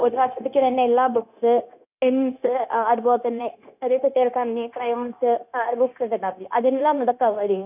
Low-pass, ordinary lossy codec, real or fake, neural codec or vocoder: 3.6 kHz; none; fake; codec, 16 kHz, 0.9 kbps, LongCat-Audio-Codec